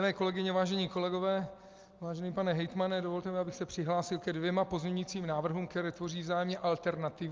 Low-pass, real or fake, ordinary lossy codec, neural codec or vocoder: 7.2 kHz; real; Opus, 24 kbps; none